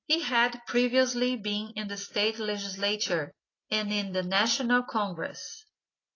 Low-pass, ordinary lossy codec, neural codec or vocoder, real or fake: 7.2 kHz; AAC, 32 kbps; none; real